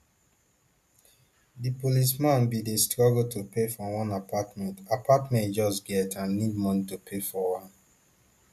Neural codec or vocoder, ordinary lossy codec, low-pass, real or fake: none; none; 14.4 kHz; real